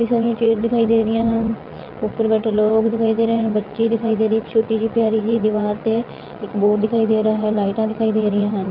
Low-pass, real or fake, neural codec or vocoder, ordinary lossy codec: 5.4 kHz; fake; vocoder, 22.05 kHz, 80 mel bands, WaveNeXt; none